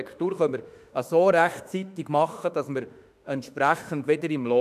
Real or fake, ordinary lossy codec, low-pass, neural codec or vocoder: fake; none; 14.4 kHz; autoencoder, 48 kHz, 32 numbers a frame, DAC-VAE, trained on Japanese speech